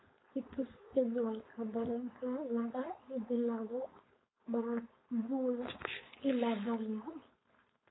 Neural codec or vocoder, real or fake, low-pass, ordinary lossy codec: codec, 16 kHz, 4.8 kbps, FACodec; fake; 7.2 kHz; AAC, 16 kbps